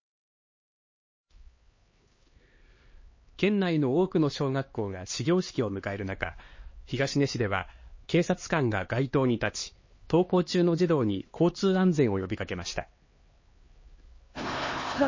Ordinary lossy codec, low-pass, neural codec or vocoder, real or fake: MP3, 32 kbps; 7.2 kHz; codec, 16 kHz, 2 kbps, X-Codec, HuBERT features, trained on LibriSpeech; fake